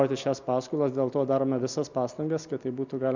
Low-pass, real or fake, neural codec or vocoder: 7.2 kHz; real; none